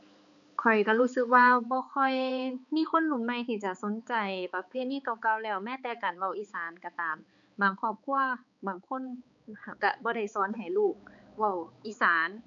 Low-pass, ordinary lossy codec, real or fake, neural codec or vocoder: 7.2 kHz; none; fake; codec, 16 kHz, 4 kbps, X-Codec, HuBERT features, trained on balanced general audio